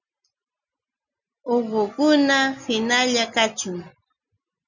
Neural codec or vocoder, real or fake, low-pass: none; real; 7.2 kHz